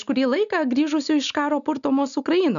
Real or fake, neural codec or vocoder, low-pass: real; none; 7.2 kHz